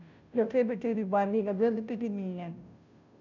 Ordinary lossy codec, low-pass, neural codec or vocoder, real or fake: none; 7.2 kHz; codec, 16 kHz, 0.5 kbps, FunCodec, trained on Chinese and English, 25 frames a second; fake